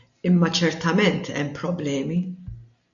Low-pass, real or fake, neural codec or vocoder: 7.2 kHz; real; none